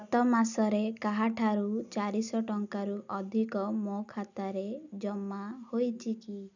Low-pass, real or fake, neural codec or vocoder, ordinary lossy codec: 7.2 kHz; real; none; none